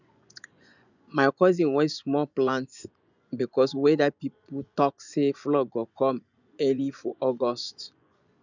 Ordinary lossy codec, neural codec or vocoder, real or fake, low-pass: none; vocoder, 24 kHz, 100 mel bands, Vocos; fake; 7.2 kHz